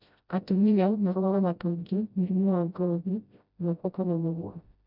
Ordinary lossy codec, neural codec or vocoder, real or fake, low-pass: none; codec, 16 kHz, 0.5 kbps, FreqCodec, smaller model; fake; 5.4 kHz